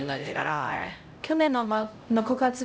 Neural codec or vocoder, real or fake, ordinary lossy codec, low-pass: codec, 16 kHz, 0.5 kbps, X-Codec, HuBERT features, trained on LibriSpeech; fake; none; none